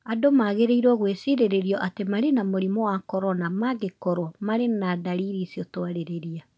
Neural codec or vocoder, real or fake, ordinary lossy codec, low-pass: none; real; none; none